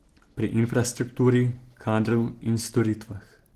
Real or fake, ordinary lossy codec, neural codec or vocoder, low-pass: real; Opus, 16 kbps; none; 14.4 kHz